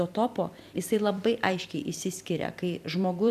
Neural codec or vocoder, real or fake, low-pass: vocoder, 44.1 kHz, 128 mel bands every 256 samples, BigVGAN v2; fake; 14.4 kHz